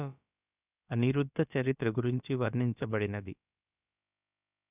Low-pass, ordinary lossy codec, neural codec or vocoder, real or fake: 3.6 kHz; none; codec, 16 kHz, about 1 kbps, DyCAST, with the encoder's durations; fake